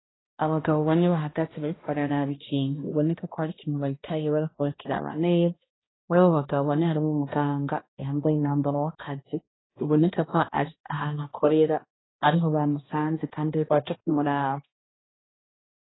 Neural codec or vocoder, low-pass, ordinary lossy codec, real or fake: codec, 16 kHz, 1 kbps, X-Codec, HuBERT features, trained on balanced general audio; 7.2 kHz; AAC, 16 kbps; fake